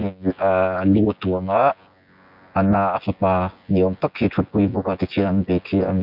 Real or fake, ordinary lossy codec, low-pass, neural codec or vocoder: fake; none; 5.4 kHz; vocoder, 24 kHz, 100 mel bands, Vocos